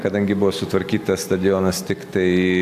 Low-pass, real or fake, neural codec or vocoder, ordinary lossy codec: 14.4 kHz; real; none; AAC, 96 kbps